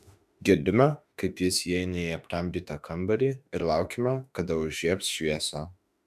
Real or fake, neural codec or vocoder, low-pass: fake; autoencoder, 48 kHz, 32 numbers a frame, DAC-VAE, trained on Japanese speech; 14.4 kHz